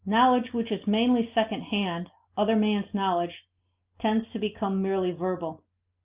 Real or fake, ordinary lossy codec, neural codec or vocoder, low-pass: real; Opus, 24 kbps; none; 3.6 kHz